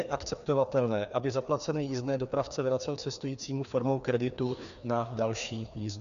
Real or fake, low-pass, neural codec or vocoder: fake; 7.2 kHz; codec, 16 kHz, 2 kbps, FreqCodec, larger model